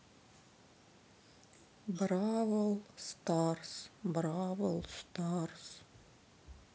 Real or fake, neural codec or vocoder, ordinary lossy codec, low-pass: real; none; none; none